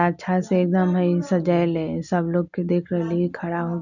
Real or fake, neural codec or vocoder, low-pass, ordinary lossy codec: real; none; 7.2 kHz; none